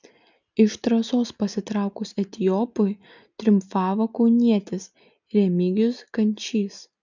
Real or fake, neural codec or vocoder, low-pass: real; none; 7.2 kHz